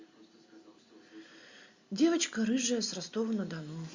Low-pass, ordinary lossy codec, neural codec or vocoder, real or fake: 7.2 kHz; Opus, 64 kbps; none; real